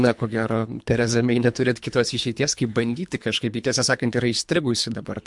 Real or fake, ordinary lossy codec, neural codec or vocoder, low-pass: fake; MP3, 64 kbps; codec, 24 kHz, 3 kbps, HILCodec; 10.8 kHz